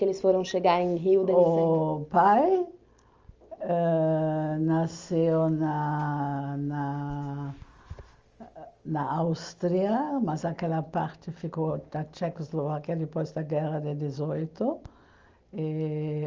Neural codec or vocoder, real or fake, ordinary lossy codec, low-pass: none; real; Opus, 32 kbps; 7.2 kHz